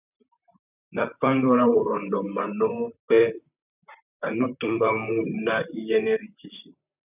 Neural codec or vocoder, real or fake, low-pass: vocoder, 44.1 kHz, 128 mel bands, Pupu-Vocoder; fake; 3.6 kHz